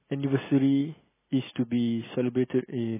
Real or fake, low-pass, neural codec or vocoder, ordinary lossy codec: real; 3.6 kHz; none; MP3, 16 kbps